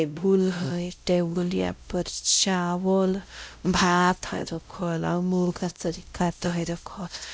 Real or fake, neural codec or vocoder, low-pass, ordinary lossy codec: fake; codec, 16 kHz, 0.5 kbps, X-Codec, WavLM features, trained on Multilingual LibriSpeech; none; none